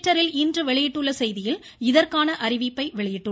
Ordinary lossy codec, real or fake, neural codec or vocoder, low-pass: none; real; none; none